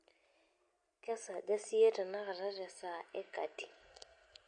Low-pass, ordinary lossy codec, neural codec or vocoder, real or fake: 9.9 kHz; MP3, 48 kbps; none; real